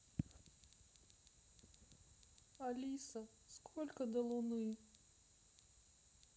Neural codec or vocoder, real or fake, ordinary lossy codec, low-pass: none; real; none; none